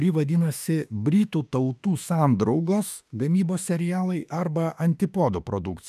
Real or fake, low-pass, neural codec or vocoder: fake; 14.4 kHz; autoencoder, 48 kHz, 32 numbers a frame, DAC-VAE, trained on Japanese speech